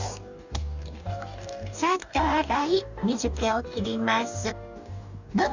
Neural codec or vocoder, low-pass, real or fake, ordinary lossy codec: codec, 44.1 kHz, 2.6 kbps, DAC; 7.2 kHz; fake; none